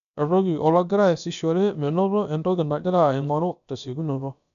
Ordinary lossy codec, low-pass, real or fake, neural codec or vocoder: none; 7.2 kHz; fake; codec, 16 kHz, about 1 kbps, DyCAST, with the encoder's durations